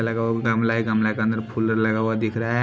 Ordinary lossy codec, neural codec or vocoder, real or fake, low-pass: none; none; real; none